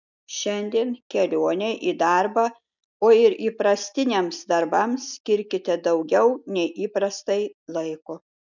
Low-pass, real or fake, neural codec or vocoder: 7.2 kHz; real; none